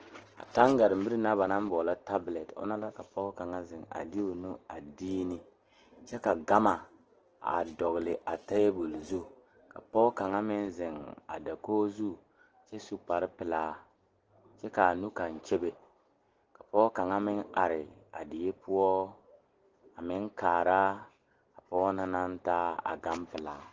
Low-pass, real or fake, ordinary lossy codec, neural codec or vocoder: 7.2 kHz; real; Opus, 16 kbps; none